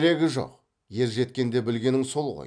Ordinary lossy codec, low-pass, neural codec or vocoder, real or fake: none; 9.9 kHz; none; real